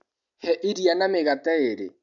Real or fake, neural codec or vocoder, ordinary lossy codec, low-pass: real; none; MP3, 64 kbps; 7.2 kHz